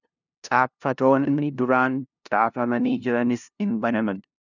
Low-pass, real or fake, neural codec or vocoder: 7.2 kHz; fake; codec, 16 kHz, 0.5 kbps, FunCodec, trained on LibriTTS, 25 frames a second